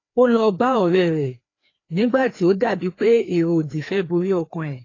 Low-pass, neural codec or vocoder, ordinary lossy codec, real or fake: 7.2 kHz; codec, 16 kHz, 2 kbps, FreqCodec, larger model; AAC, 32 kbps; fake